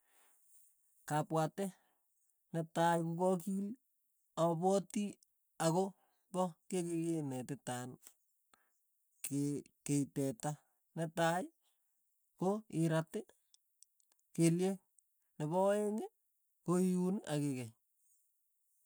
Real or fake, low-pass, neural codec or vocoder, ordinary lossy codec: real; none; none; none